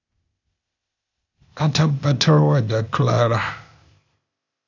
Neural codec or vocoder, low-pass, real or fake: codec, 16 kHz, 0.8 kbps, ZipCodec; 7.2 kHz; fake